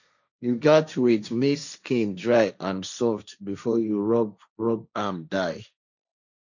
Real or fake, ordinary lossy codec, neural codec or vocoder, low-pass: fake; none; codec, 16 kHz, 1.1 kbps, Voila-Tokenizer; 7.2 kHz